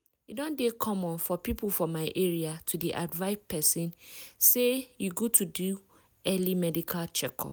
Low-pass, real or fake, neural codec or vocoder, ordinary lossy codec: none; real; none; none